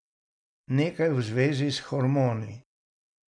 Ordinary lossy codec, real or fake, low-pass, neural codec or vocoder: none; real; 9.9 kHz; none